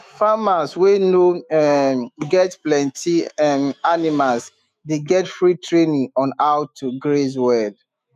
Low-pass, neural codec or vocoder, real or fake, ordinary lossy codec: 14.4 kHz; autoencoder, 48 kHz, 128 numbers a frame, DAC-VAE, trained on Japanese speech; fake; none